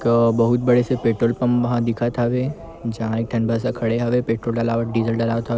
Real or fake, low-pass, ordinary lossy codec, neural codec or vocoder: real; none; none; none